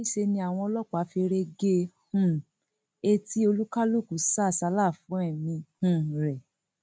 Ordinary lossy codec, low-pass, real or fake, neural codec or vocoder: none; none; real; none